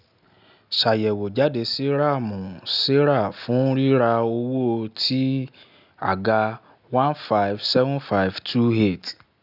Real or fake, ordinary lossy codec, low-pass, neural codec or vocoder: real; none; 5.4 kHz; none